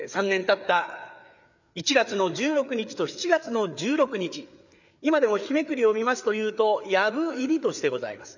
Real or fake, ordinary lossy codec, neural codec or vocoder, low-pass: fake; none; codec, 16 kHz, 4 kbps, FreqCodec, larger model; 7.2 kHz